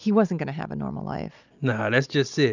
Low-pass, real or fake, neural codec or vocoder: 7.2 kHz; real; none